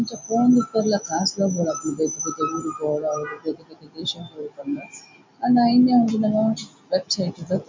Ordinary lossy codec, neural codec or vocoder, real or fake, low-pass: MP3, 64 kbps; none; real; 7.2 kHz